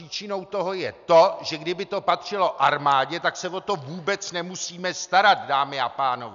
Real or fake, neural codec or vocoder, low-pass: real; none; 7.2 kHz